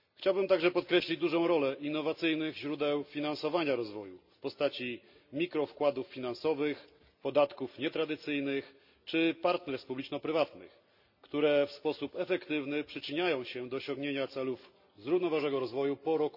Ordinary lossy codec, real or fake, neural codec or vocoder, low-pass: none; real; none; 5.4 kHz